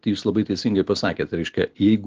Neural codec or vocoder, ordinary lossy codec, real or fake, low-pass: none; Opus, 16 kbps; real; 7.2 kHz